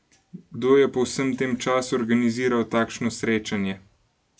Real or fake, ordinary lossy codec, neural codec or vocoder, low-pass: real; none; none; none